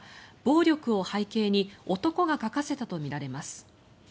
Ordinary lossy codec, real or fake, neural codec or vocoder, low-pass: none; real; none; none